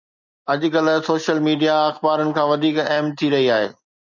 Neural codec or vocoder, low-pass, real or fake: none; 7.2 kHz; real